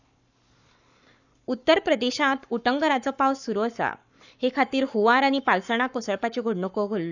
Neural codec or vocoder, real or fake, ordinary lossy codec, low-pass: codec, 44.1 kHz, 7.8 kbps, Pupu-Codec; fake; none; 7.2 kHz